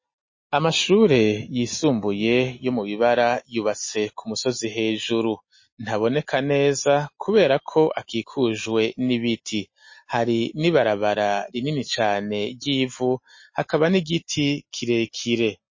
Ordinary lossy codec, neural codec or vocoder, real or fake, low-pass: MP3, 32 kbps; none; real; 7.2 kHz